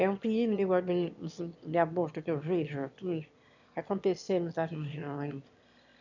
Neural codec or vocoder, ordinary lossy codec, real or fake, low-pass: autoencoder, 22.05 kHz, a latent of 192 numbers a frame, VITS, trained on one speaker; none; fake; 7.2 kHz